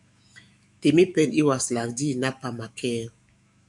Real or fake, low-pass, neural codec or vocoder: fake; 10.8 kHz; autoencoder, 48 kHz, 128 numbers a frame, DAC-VAE, trained on Japanese speech